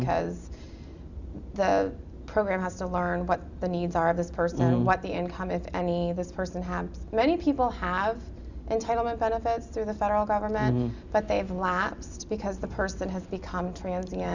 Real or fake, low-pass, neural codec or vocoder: real; 7.2 kHz; none